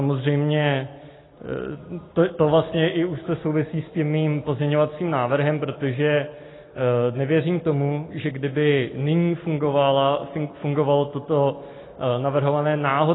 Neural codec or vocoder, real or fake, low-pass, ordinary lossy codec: none; real; 7.2 kHz; AAC, 16 kbps